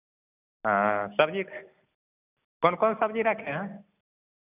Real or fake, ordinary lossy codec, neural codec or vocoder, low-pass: real; none; none; 3.6 kHz